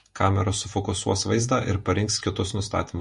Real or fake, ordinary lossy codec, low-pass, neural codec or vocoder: fake; MP3, 48 kbps; 14.4 kHz; vocoder, 48 kHz, 128 mel bands, Vocos